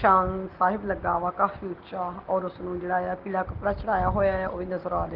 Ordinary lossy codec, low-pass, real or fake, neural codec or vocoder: Opus, 16 kbps; 5.4 kHz; real; none